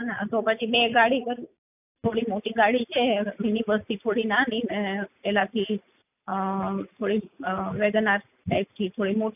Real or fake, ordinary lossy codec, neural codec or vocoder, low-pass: real; none; none; 3.6 kHz